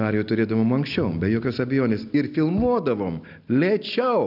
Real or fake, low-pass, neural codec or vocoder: real; 5.4 kHz; none